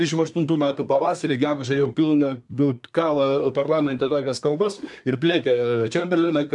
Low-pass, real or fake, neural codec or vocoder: 10.8 kHz; fake; codec, 24 kHz, 1 kbps, SNAC